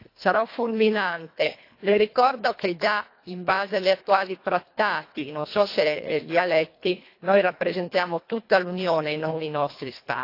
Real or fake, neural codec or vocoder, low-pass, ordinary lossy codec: fake; codec, 24 kHz, 1.5 kbps, HILCodec; 5.4 kHz; AAC, 32 kbps